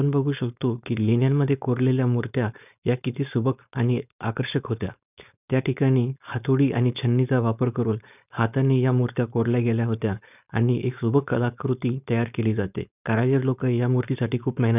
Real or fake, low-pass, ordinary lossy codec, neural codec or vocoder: fake; 3.6 kHz; none; codec, 16 kHz, 4.8 kbps, FACodec